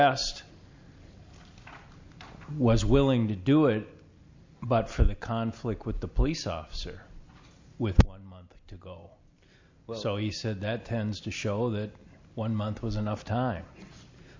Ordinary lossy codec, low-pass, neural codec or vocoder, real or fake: MP3, 48 kbps; 7.2 kHz; none; real